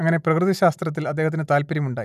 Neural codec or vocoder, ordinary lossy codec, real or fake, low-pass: none; none; real; 14.4 kHz